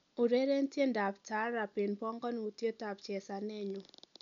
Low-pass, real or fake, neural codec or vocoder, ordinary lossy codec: 7.2 kHz; real; none; none